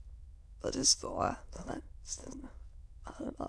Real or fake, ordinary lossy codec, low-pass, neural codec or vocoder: fake; none; none; autoencoder, 22.05 kHz, a latent of 192 numbers a frame, VITS, trained on many speakers